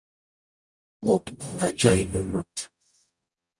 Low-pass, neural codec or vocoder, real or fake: 10.8 kHz; codec, 44.1 kHz, 0.9 kbps, DAC; fake